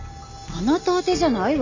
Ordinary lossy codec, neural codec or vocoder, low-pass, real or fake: none; none; 7.2 kHz; real